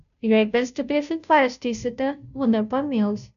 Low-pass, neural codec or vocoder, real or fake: 7.2 kHz; codec, 16 kHz, 0.5 kbps, FunCodec, trained on Chinese and English, 25 frames a second; fake